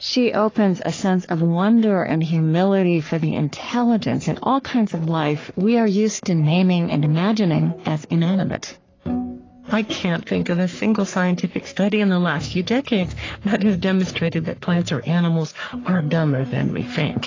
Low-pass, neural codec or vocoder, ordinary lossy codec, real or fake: 7.2 kHz; codec, 44.1 kHz, 3.4 kbps, Pupu-Codec; AAC, 32 kbps; fake